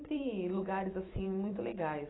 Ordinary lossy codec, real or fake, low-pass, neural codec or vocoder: AAC, 16 kbps; fake; 7.2 kHz; vocoder, 44.1 kHz, 128 mel bands every 256 samples, BigVGAN v2